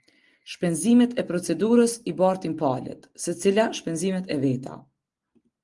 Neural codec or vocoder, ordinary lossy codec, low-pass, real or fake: none; Opus, 24 kbps; 10.8 kHz; real